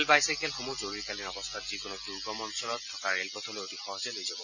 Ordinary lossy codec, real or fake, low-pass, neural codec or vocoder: none; real; 7.2 kHz; none